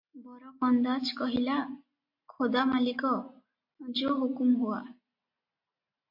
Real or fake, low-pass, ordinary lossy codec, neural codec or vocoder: real; 5.4 kHz; MP3, 32 kbps; none